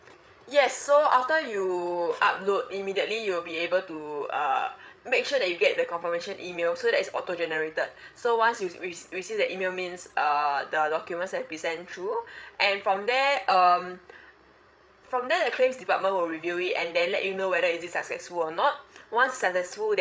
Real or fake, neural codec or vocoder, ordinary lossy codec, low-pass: fake; codec, 16 kHz, 8 kbps, FreqCodec, larger model; none; none